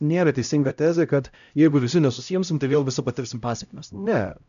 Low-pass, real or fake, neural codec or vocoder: 7.2 kHz; fake; codec, 16 kHz, 0.5 kbps, X-Codec, HuBERT features, trained on LibriSpeech